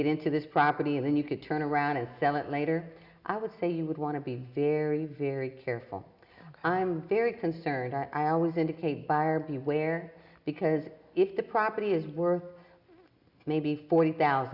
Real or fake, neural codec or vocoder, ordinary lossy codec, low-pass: real; none; Opus, 64 kbps; 5.4 kHz